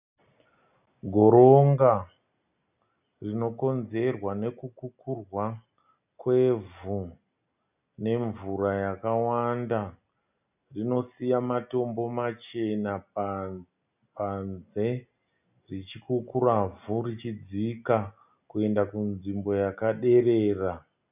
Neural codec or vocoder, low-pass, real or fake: none; 3.6 kHz; real